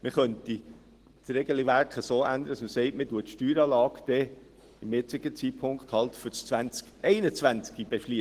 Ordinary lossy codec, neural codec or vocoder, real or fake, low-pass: Opus, 16 kbps; none; real; 14.4 kHz